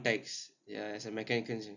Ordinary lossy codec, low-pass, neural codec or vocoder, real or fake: none; 7.2 kHz; none; real